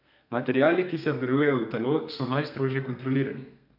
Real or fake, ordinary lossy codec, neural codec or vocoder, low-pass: fake; none; codec, 32 kHz, 1.9 kbps, SNAC; 5.4 kHz